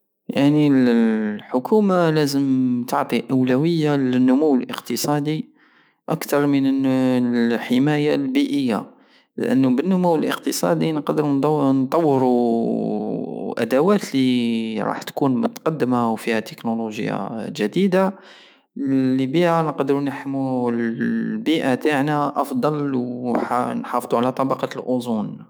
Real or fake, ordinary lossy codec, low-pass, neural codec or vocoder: fake; none; none; autoencoder, 48 kHz, 128 numbers a frame, DAC-VAE, trained on Japanese speech